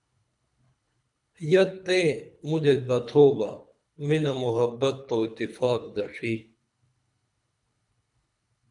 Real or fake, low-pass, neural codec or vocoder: fake; 10.8 kHz; codec, 24 kHz, 3 kbps, HILCodec